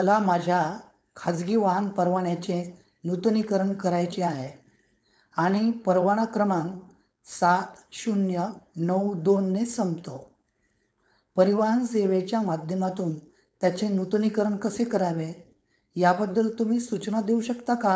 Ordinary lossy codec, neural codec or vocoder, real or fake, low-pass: none; codec, 16 kHz, 4.8 kbps, FACodec; fake; none